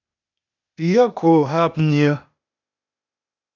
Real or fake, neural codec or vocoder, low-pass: fake; codec, 16 kHz, 0.8 kbps, ZipCodec; 7.2 kHz